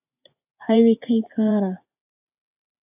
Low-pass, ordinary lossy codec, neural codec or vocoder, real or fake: 3.6 kHz; AAC, 32 kbps; vocoder, 24 kHz, 100 mel bands, Vocos; fake